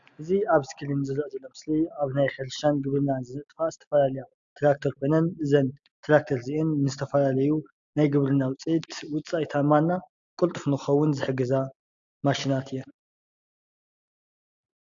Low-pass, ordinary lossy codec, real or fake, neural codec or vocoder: 7.2 kHz; AAC, 64 kbps; real; none